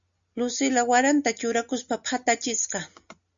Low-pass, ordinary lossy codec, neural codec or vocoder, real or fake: 7.2 kHz; MP3, 64 kbps; none; real